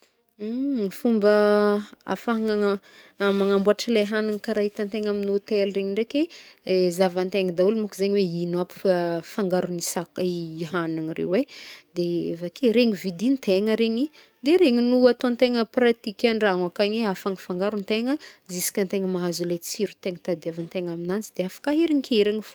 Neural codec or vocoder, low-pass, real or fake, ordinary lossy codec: codec, 44.1 kHz, 7.8 kbps, DAC; none; fake; none